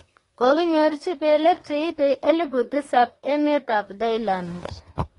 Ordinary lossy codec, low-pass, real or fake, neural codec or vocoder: AAC, 32 kbps; 10.8 kHz; fake; codec, 24 kHz, 1 kbps, SNAC